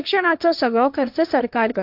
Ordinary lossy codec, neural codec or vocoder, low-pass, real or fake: AAC, 48 kbps; codec, 16 kHz, 1.1 kbps, Voila-Tokenizer; 5.4 kHz; fake